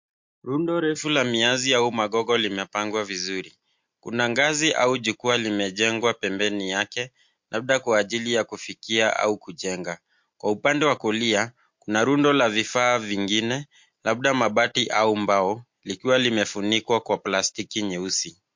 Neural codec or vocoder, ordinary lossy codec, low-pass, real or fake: none; MP3, 48 kbps; 7.2 kHz; real